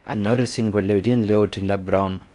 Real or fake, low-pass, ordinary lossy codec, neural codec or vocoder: fake; 10.8 kHz; none; codec, 16 kHz in and 24 kHz out, 0.6 kbps, FocalCodec, streaming, 4096 codes